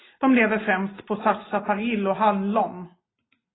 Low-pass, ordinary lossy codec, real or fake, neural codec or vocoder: 7.2 kHz; AAC, 16 kbps; real; none